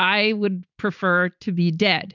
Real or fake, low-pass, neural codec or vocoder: real; 7.2 kHz; none